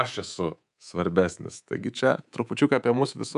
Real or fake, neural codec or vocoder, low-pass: fake; codec, 24 kHz, 3.1 kbps, DualCodec; 10.8 kHz